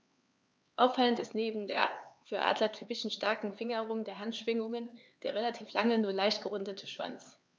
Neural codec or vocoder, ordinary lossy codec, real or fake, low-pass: codec, 16 kHz, 4 kbps, X-Codec, HuBERT features, trained on LibriSpeech; none; fake; none